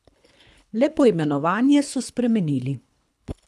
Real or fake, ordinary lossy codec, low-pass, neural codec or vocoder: fake; none; none; codec, 24 kHz, 3 kbps, HILCodec